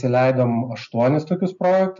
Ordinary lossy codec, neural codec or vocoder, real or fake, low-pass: MP3, 96 kbps; none; real; 7.2 kHz